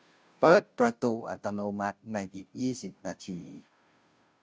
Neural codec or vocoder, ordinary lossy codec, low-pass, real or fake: codec, 16 kHz, 0.5 kbps, FunCodec, trained on Chinese and English, 25 frames a second; none; none; fake